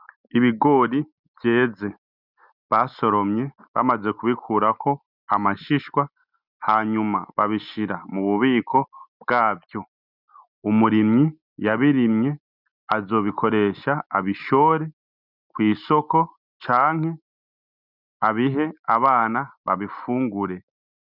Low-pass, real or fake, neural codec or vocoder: 5.4 kHz; real; none